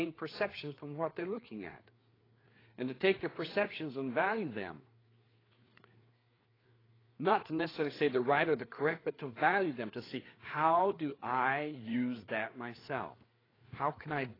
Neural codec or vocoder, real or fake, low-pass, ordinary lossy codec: codec, 16 kHz, 4 kbps, FreqCodec, larger model; fake; 5.4 kHz; AAC, 24 kbps